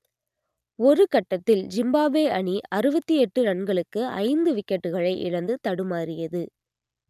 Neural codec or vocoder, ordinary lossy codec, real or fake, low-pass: none; none; real; 14.4 kHz